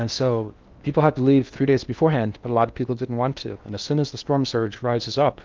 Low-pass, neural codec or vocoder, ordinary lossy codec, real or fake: 7.2 kHz; codec, 16 kHz in and 24 kHz out, 0.8 kbps, FocalCodec, streaming, 65536 codes; Opus, 24 kbps; fake